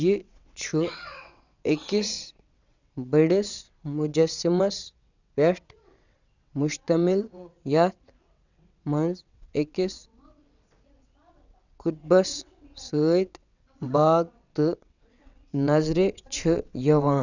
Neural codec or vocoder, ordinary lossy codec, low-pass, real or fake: vocoder, 22.05 kHz, 80 mel bands, WaveNeXt; none; 7.2 kHz; fake